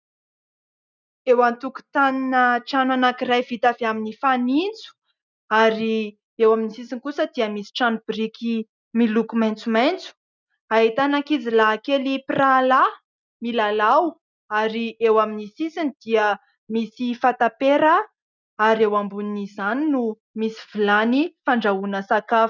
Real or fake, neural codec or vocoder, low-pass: real; none; 7.2 kHz